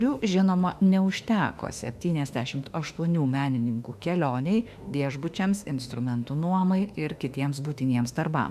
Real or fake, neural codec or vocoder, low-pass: fake; autoencoder, 48 kHz, 32 numbers a frame, DAC-VAE, trained on Japanese speech; 14.4 kHz